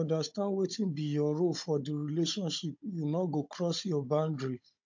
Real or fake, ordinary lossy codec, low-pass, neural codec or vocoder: fake; MP3, 48 kbps; 7.2 kHz; codec, 16 kHz, 16 kbps, FunCodec, trained on Chinese and English, 50 frames a second